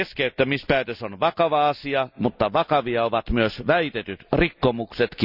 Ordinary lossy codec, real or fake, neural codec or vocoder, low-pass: none; real; none; 5.4 kHz